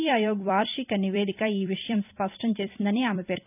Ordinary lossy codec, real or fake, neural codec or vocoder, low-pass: none; fake; vocoder, 44.1 kHz, 128 mel bands every 256 samples, BigVGAN v2; 3.6 kHz